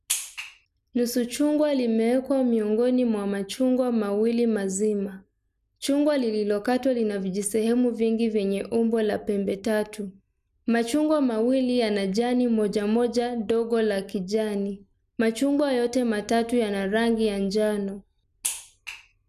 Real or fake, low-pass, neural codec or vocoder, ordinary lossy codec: real; 14.4 kHz; none; none